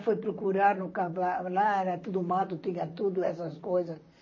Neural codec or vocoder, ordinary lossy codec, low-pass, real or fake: vocoder, 44.1 kHz, 128 mel bands, Pupu-Vocoder; MP3, 32 kbps; 7.2 kHz; fake